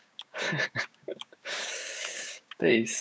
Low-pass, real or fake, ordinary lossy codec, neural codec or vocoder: none; fake; none; codec, 16 kHz, 6 kbps, DAC